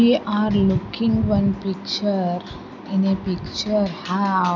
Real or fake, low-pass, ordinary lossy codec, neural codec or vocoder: real; 7.2 kHz; none; none